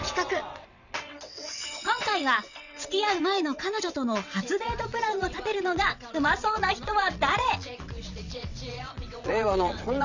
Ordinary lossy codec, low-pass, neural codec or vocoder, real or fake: none; 7.2 kHz; vocoder, 22.05 kHz, 80 mel bands, WaveNeXt; fake